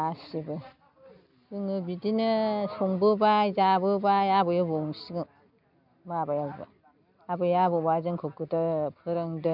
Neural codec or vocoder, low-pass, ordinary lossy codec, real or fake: none; 5.4 kHz; none; real